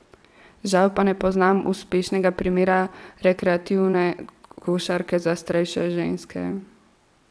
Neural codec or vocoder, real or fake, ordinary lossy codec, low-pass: vocoder, 22.05 kHz, 80 mel bands, WaveNeXt; fake; none; none